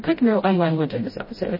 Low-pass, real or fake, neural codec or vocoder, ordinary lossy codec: 5.4 kHz; fake; codec, 16 kHz, 0.5 kbps, FreqCodec, smaller model; MP3, 24 kbps